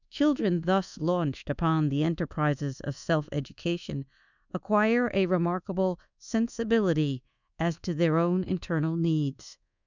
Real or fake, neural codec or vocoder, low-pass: fake; codec, 24 kHz, 1.2 kbps, DualCodec; 7.2 kHz